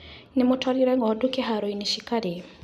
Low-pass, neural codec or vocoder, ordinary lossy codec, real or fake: 14.4 kHz; none; none; real